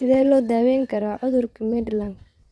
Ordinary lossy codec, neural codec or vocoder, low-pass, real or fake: none; vocoder, 22.05 kHz, 80 mel bands, WaveNeXt; none; fake